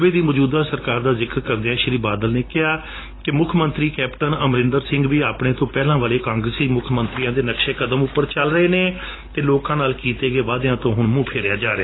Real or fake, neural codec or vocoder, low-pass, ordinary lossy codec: real; none; 7.2 kHz; AAC, 16 kbps